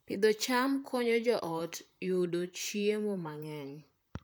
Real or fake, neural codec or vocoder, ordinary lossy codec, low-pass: fake; vocoder, 44.1 kHz, 128 mel bands, Pupu-Vocoder; none; none